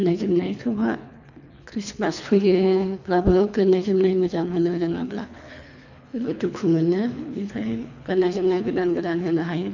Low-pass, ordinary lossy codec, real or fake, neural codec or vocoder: 7.2 kHz; none; fake; codec, 24 kHz, 3 kbps, HILCodec